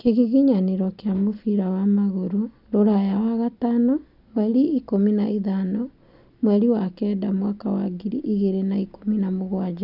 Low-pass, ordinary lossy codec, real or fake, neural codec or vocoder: 5.4 kHz; none; real; none